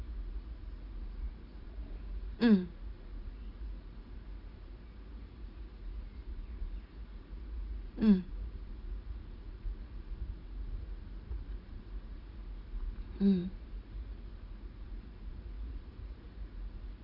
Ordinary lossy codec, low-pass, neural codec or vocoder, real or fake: none; 5.4 kHz; vocoder, 44.1 kHz, 80 mel bands, Vocos; fake